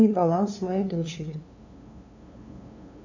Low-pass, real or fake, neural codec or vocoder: 7.2 kHz; fake; codec, 16 kHz, 2 kbps, FunCodec, trained on LibriTTS, 25 frames a second